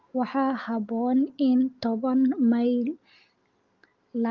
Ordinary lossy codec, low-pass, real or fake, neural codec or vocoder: Opus, 32 kbps; 7.2 kHz; real; none